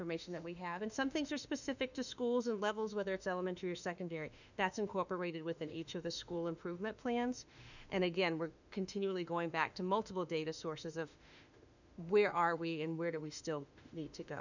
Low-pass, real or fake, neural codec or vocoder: 7.2 kHz; fake; autoencoder, 48 kHz, 32 numbers a frame, DAC-VAE, trained on Japanese speech